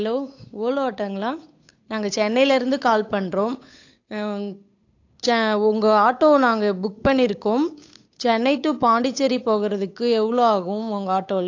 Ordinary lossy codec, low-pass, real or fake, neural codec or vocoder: AAC, 48 kbps; 7.2 kHz; fake; codec, 16 kHz, 8 kbps, FunCodec, trained on Chinese and English, 25 frames a second